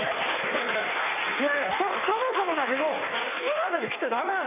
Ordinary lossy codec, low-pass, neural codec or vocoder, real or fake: none; 3.6 kHz; codec, 16 kHz in and 24 kHz out, 1.1 kbps, FireRedTTS-2 codec; fake